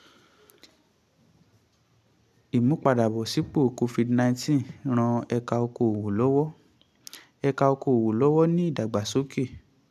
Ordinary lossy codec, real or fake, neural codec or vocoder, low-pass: none; real; none; 14.4 kHz